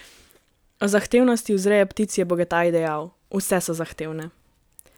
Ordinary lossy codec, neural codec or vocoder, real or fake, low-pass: none; none; real; none